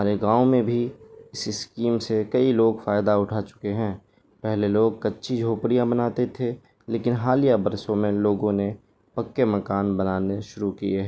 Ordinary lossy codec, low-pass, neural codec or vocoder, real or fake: none; none; none; real